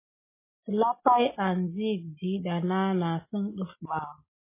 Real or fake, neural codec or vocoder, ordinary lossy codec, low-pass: real; none; MP3, 16 kbps; 3.6 kHz